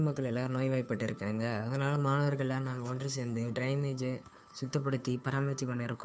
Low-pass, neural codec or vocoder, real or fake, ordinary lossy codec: none; codec, 16 kHz, 2 kbps, FunCodec, trained on Chinese and English, 25 frames a second; fake; none